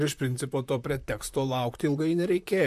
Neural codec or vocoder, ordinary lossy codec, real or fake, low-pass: vocoder, 44.1 kHz, 128 mel bands, Pupu-Vocoder; MP3, 96 kbps; fake; 14.4 kHz